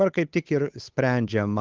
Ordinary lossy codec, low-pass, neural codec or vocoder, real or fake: Opus, 32 kbps; 7.2 kHz; codec, 16 kHz, 8 kbps, FunCodec, trained on Chinese and English, 25 frames a second; fake